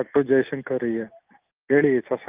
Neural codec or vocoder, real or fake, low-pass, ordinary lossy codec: none; real; 3.6 kHz; Opus, 24 kbps